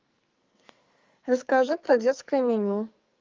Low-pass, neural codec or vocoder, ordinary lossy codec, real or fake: 7.2 kHz; codec, 44.1 kHz, 2.6 kbps, SNAC; Opus, 32 kbps; fake